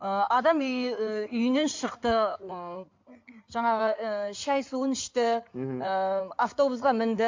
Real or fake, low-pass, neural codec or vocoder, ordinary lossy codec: fake; 7.2 kHz; codec, 16 kHz in and 24 kHz out, 2.2 kbps, FireRedTTS-2 codec; MP3, 48 kbps